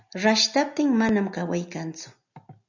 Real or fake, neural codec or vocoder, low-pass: real; none; 7.2 kHz